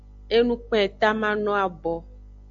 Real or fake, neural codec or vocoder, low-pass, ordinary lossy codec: real; none; 7.2 kHz; AAC, 64 kbps